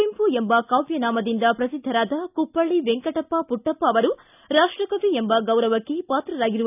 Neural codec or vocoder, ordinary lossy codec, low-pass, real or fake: none; none; 3.6 kHz; real